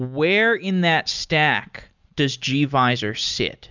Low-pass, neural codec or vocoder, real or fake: 7.2 kHz; none; real